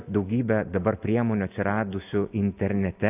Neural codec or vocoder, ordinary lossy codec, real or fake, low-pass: none; AAC, 32 kbps; real; 3.6 kHz